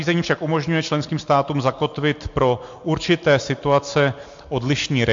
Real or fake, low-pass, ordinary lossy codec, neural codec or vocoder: real; 7.2 kHz; MP3, 48 kbps; none